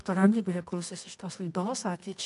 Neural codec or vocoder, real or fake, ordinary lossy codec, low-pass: codec, 24 kHz, 0.9 kbps, WavTokenizer, medium music audio release; fake; MP3, 96 kbps; 10.8 kHz